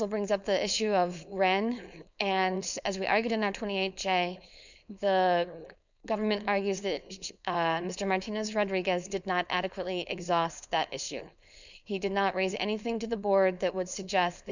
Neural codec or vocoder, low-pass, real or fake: codec, 16 kHz, 4.8 kbps, FACodec; 7.2 kHz; fake